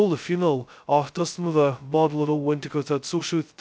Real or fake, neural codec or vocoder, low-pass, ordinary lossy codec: fake; codec, 16 kHz, 0.2 kbps, FocalCodec; none; none